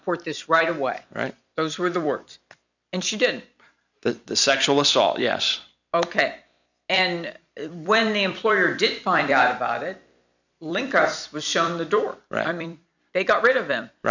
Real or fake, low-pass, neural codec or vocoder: fake; 7.2 kHz; vocoder, 44.1 kHz, 128 mel bands every 256 samples, BigVGAN v2